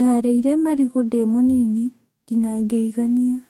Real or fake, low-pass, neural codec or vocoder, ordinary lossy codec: fake; 19.8 kHz; codec, 44.1 kHz, 2.6 kbps, DAC; MP3, 64 kbps